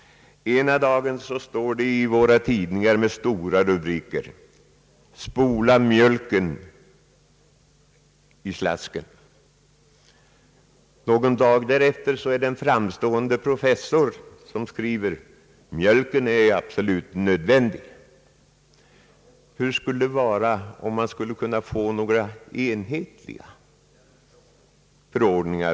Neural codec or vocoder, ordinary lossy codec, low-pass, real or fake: none; none; none; real